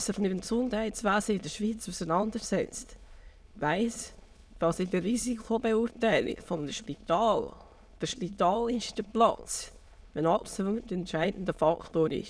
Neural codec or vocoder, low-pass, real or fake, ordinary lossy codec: autoencoder, 22.05 kHz, a latent of 192 numbers a frame, VITS, trained on many speakers; none; fake; none